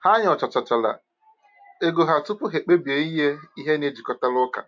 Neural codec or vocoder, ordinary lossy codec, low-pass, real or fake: none; MP3, 48 kbps; 7.2 kHz; real